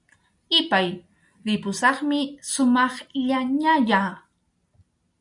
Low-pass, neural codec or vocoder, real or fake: 10.8 kHz; none; real